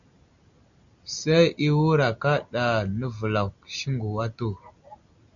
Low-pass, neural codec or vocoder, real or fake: 7.2 kHz; none; real